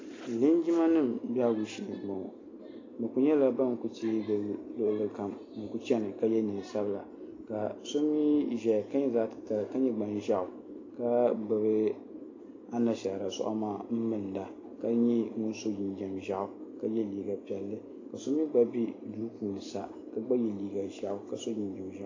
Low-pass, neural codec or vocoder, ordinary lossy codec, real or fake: 7.2 kHz; none; AAC, 32 kbps; real